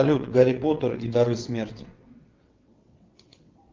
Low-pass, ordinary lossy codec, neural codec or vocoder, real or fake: 7.2 kHz; Opus, 32 kbps; vocoder, 22.05 kHz, 80 mel bands, WaveNeXt; fake